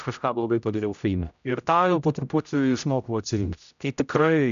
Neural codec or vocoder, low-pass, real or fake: codec, 16 kHz, 0.5 kbps, X-Codec, HuBERT features, trained on general audio; 7.2 kHz; fake